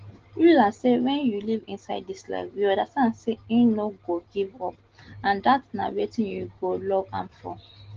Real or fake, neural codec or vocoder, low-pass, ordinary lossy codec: real; none; 7.2 kHz; Opus, 16 kbps